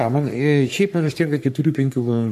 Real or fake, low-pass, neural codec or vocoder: fake; 14.4 kHz; codec, 44.1 kHz, 3.4 kbps, Pupu-Codec